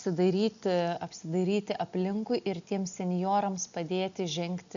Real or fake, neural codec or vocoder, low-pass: real; none; 7.2 kHz